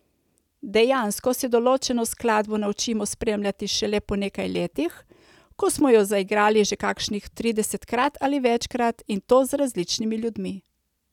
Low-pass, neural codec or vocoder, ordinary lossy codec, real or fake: 19.8 kHz; none; none; real